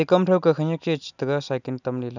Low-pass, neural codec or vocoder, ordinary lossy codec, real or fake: 7.2 kHz; none; none; real